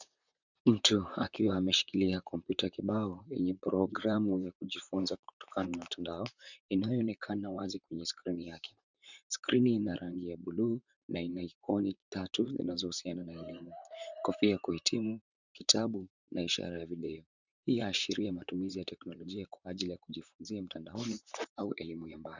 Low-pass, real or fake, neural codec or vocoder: 7.2 kHz; fake; vocoder, 44.1 kHz, 128 mel bands, Pupu-Vocoder